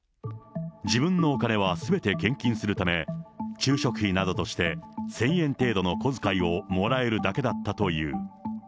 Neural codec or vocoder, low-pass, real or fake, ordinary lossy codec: none; none; real; none